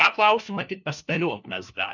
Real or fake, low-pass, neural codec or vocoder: fake; 7.2 kHz; codec, 16 kHz, 1 kbps, FunCodec, trained on LibriTTS, 50 frames a second